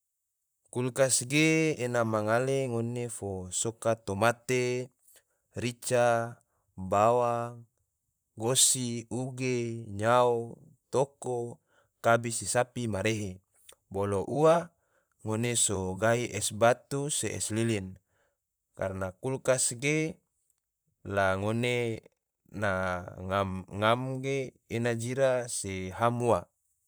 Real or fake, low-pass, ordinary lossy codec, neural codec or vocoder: fake; none; none; vocoder, 44.1 kHz, 128 mel bands, Pupu-Vocoder